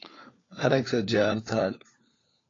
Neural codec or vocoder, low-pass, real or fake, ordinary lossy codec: codec, 16 kHz, 4 kbps, FunCodec, trained on LibriTTS, 50 frames a second; 7.2 kHz; fake; AAC, 32 kbps